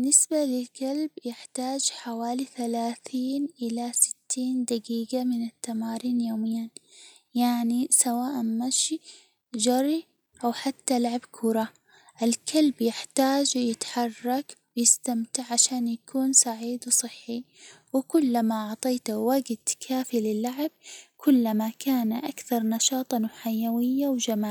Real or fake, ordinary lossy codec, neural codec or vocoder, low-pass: real; none; none; none